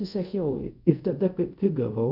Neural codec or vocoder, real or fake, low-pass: codec, 24 kHz, 0.5 kbps, DualCodec; fake; 5.4 kHz